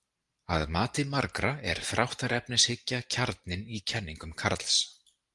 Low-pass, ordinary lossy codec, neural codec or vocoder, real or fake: 10.8 kHz; Opus, 24 kbps; vocoder, 44.1 kHz, 128 mel bands every 512 samples, BigVGAN v2; fake